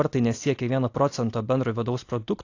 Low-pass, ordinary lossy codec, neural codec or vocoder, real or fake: 7.2 kHz; AAC, 48 kbps; none; real